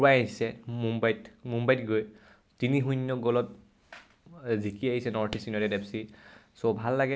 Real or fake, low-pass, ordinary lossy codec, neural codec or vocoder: real; none; none; none